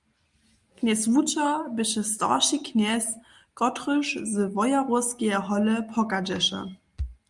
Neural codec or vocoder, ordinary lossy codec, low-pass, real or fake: none; Opus, 32 kbps; 10.8 kHz; real